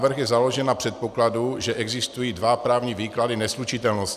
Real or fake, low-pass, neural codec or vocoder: real; 14.4 kHz; none